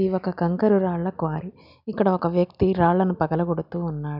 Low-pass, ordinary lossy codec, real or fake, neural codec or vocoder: 5.4 kHz; none; real; none